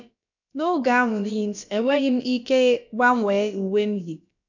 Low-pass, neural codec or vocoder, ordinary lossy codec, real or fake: 7.2 kHz; codec, 16 kHz, about 1 kbps, DyCAST, with the encoder's durations; none; fake